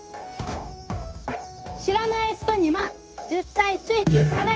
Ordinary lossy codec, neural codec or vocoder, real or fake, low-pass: none; codec, 16 kHz, 0.9 kbps, LongCat-Audio-Codec; fake; none